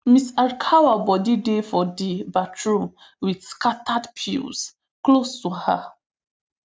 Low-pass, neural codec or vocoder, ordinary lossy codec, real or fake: none; none; none; real